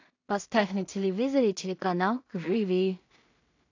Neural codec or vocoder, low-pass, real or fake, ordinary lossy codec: codec, 16 kHz in and 24 kHz out, 0.4 kbps, LongCat-Audio-Codec, two codebook decoder; 7.2 kHz; fake; AAC, 48 kbps